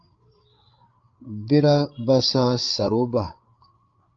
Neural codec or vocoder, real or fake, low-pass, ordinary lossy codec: codec, 16 kHz, 8 kbps, FreqCodec, larger model; fake; 7.2 kHz; Opus, 24 kbps